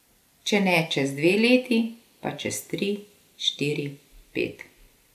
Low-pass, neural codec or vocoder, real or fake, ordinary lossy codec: 14.4 kHz; none; real; none